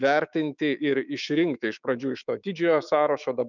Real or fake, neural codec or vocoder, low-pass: fake; autoencoder, 48 kHz, 128 numbers a frame, DAC-VAE, trained on Japanese speech; 7.2 kHz